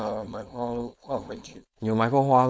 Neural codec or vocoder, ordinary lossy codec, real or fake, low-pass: codec, 16 kHz, 4.8 kbps, FACodec; none; fake; none